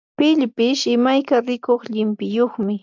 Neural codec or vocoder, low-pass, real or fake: none; 7.2 kHz; real